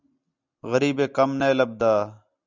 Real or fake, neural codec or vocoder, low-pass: real; none; 7.2 kHz